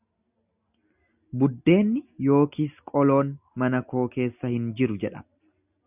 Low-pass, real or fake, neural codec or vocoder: 3.6 kHz; real; none